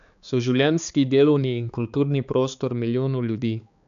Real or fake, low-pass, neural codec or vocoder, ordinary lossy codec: fake; 7.2 kHz; codec, 16 kHz, 4 kbps, X-Codec, HuBERT features, trained on balanced general audio; none